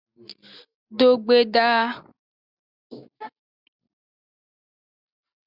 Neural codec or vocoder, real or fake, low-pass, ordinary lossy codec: none; real; 5.4 kHz; Opus, 64 kbps